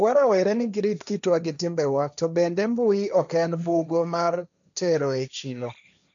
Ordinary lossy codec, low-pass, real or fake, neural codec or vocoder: none; 7.2 kHz; fake; codec, 16 kHz, 1.1 kbps, Voila-Tokenizer